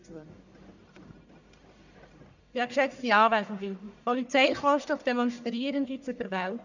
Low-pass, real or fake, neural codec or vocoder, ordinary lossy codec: 7.2 kHz; fake; codec, 44.1 kHz, 1.7 kbps, Pupu-Codec; none